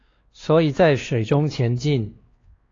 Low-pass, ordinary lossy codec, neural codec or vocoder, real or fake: 7.2 kHz; AAC, 32 kbps; codec, 16 kHz, 2 kbps, X-Codec, WavLM features, trained on Multilingual LibriSpeech; fake